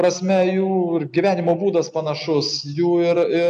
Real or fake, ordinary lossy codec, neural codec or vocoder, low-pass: real; AAC, 96 kbps; none; 9.9 kHz